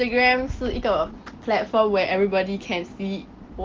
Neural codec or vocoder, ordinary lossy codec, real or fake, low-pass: none; Opus, 16 kbps; real; 7.2 kHz